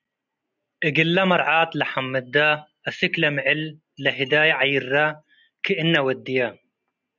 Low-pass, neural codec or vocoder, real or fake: 7.2 kHz; none; real